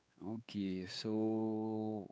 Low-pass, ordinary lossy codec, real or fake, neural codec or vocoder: none; none; fake; codec, 16 kHz, 4 kbps, X-Codec, WavLM features, trained on Multilingual LibriSpeech